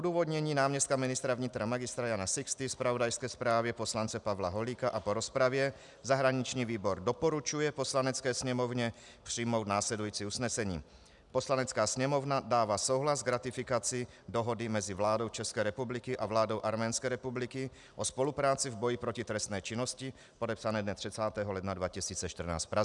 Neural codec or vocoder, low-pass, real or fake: none; 10.8 kHz; real